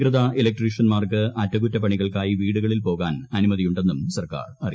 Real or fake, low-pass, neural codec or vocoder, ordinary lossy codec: real; none; none; none